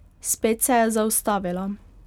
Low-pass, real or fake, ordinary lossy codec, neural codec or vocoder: 19.8 kHz; real; none; none